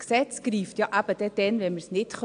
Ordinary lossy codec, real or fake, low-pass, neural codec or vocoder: AAC, 64 kbps; real; 9.9 kHz; none